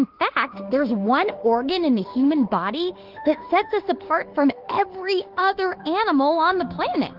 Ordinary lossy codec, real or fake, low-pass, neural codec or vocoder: Opus, 16 kbps; fake; 5.4 kHz; autoencoder, 48 kHz, 32 numbers a frame, DAC-VAE, trained on Japanese speech